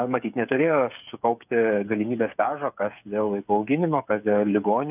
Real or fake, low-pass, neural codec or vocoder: fake; 3.6 kHz; codec, 16 kHz, 8 kbps, FreqCodec, smaller model